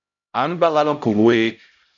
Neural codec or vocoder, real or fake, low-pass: codec, 16 kHz, 0.5 kbps, X-Codec, HuBERT features, trained on LibriSpeech; fake; 7.2 kHz